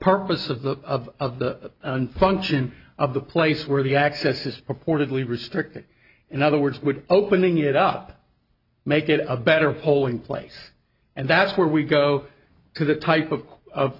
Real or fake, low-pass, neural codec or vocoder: real; 5.4 kHz; none